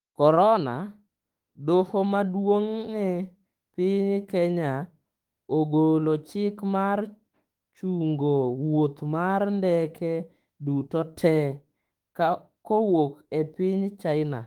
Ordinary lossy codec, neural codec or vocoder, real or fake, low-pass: Opus, 24 kbps; autoencoder, 48 kHz, 32 numbers a frame, DAC-VAE, trained on Japanese speech; fake; 19.8 kHz